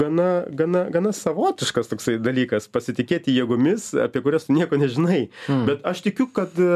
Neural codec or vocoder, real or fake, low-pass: none; real; 14.4 kHz